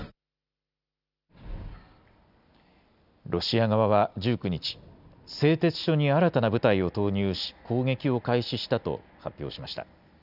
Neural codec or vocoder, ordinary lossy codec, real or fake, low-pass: none; none; real; 5.4 kHz